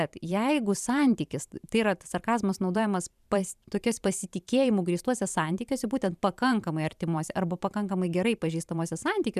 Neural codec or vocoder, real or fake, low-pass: vocoder, 44.1 kHz, 128 mel bands every 512 samples, BigVGAN v2; fake; 14.4 kHz